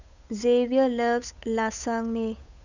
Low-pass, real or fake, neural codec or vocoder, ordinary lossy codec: 7.2 kHz; fake; codec, 16 kHz, 8 kbps, FunCodec, trained on Chinese and English, 25 frames a second; none